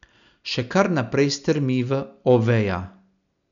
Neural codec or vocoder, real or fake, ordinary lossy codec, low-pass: none; real; none; 7.2 kHz